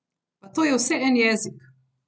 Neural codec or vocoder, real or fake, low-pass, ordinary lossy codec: none; real; none; none